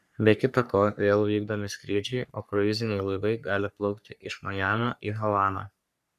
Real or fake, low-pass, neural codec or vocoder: fake; 14.4 kHz; codec, 44.1 kHz, 3.4 kbps, Pupu-Codec